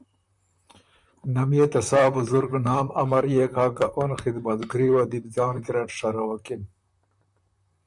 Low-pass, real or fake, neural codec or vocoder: 10.8 kHz; fake; vocoder, 44.1 kHz, 128 mel bands, Pupu-Vocoder